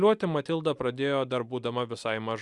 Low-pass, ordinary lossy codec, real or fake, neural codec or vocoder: 10.8 kHz; Opus, 64 kbps; real; none